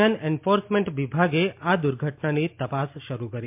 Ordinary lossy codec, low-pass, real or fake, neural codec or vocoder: MP3, 32 kbps; 3.6 kHz; real; none